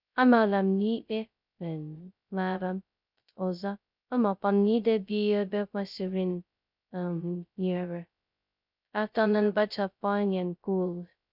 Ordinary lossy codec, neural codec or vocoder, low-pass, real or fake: none; codec, 16 kHz, 0.2 kbps, FocalCodec; 5.4 kHz; fake